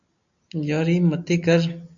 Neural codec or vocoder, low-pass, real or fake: none; 7.2 kHz; real